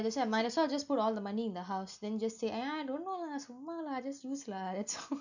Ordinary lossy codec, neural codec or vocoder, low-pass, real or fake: none; none; 7.2 kHz; real